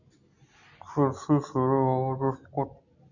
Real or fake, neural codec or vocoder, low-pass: real; none; 7.2 kHz